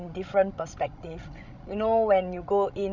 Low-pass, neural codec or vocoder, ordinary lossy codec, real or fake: 7.2 kHz; codec, 16 kHz, 16 kbps, FreqCodec, larger model; none; fake